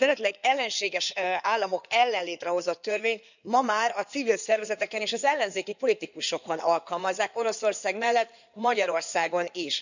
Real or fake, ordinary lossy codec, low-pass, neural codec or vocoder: fake; none; 7.2 kHz; codec, 16 kHz in and 24 kHz out, 2.2 kbps, FireRedTTS-2 codec